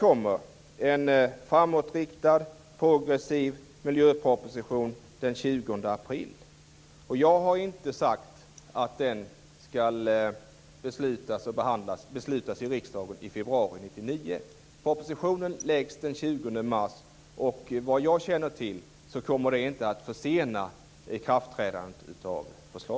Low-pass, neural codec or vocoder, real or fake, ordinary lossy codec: none; none; real; none